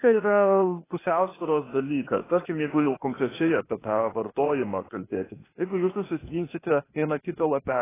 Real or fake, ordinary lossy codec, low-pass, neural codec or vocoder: fake; AAC, 16 kbps; 3.6 kHz; codec, 16 kHz, 0.8 kbps, ZipCodec